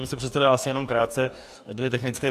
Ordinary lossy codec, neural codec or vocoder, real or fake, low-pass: AAC, 96 kbps; codec, 44.1 kHz, 2.6 kbps, DAC; fake; 14.4 kHz